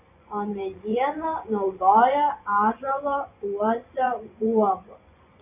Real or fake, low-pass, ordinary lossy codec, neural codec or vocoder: fake; 3.6 kHz; AAC, 32 kbps; vocoder, 24 kHz, 100 mel bands, Vocos